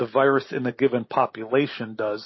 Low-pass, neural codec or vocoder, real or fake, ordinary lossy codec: 7.2 kHz; none; real; MP3, 24 kbps